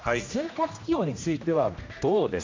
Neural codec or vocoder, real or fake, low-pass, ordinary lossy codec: codec, 16 kHz, 1 kbps, X-Codec, HuBERT features, trained on general audio; fake; 7.2 kHz; MP3, 48 kbps